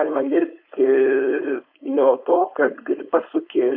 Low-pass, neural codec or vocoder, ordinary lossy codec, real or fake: 5.4 kHz; codec, 16 kHz, 4.8 kbps, FACodec; AAC, 48 kbps; fake